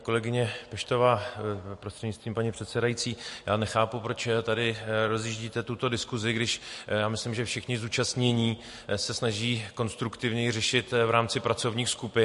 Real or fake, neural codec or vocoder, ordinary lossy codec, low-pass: real; none; MP3, 48 kbps; 14.4 kHz